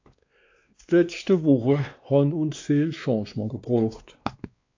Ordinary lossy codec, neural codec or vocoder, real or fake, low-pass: AAC, 48 kbps; codec, 16 kHz, 2 kbps, X-Codec, WavLM features, trained on Multilingual LibriSpeech; fake; 7.2 kHz